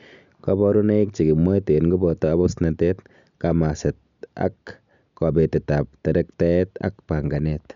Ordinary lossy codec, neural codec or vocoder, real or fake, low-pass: MP3, 96 kbps; none; real; 7.2 kHz